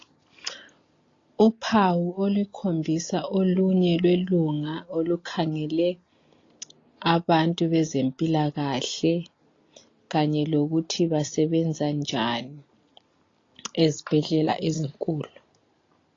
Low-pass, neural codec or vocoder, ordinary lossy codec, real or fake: 7.2 kHz; none; AAC, 32 kbps; real